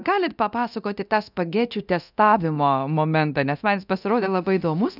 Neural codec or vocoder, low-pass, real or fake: codec, 24 kHz, 0.9 kbps, DualCodec; 5.4 kHz; fake